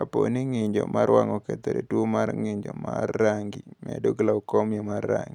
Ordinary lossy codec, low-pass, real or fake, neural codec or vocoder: none; 19.8 kHz; real; none